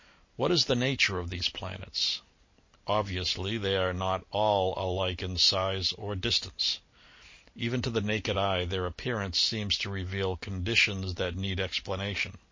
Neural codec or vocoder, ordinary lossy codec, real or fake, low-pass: none; MP3, 32 kbps; real; 7.2 kHz